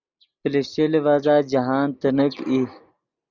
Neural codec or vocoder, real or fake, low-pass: none; real; 7.2 kHz